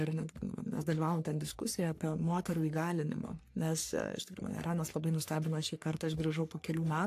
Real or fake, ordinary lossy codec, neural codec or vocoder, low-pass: fake; AAC, 64 kbps; codec, 44.1 kHz, 3.4 kbps, Pupu-Codec; 14.4 kHz